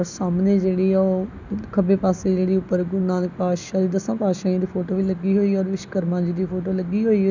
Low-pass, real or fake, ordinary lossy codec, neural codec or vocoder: 7.2 kHz; real; none; none